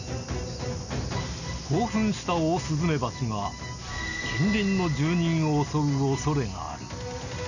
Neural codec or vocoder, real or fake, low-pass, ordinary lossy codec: none; real; 7.2 kHz; none